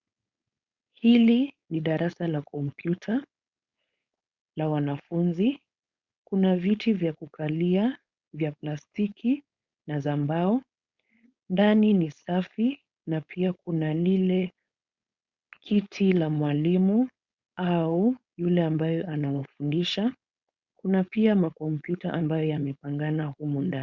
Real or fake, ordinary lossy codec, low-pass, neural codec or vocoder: fake; Opus, 64 kbps; 7.2 kHz; codec, 16 kHz, 4.8 kbps, FACodec